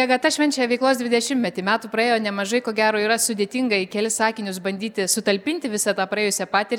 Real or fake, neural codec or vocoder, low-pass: real; none; 19.8 kHz